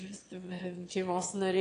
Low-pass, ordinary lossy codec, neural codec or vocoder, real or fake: 9.9 kHz; AAC, 48 kbps; autoencoder, 22.05 kHz, a latent of 192 numbers a frame, VITS, trained on one speaker; fake